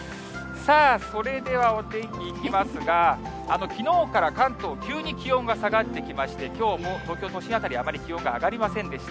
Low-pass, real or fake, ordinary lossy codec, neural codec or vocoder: none; real; none; none